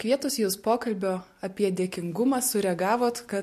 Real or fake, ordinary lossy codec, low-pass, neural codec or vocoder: real; MP3, 64 kbps; 14.4 kHz; none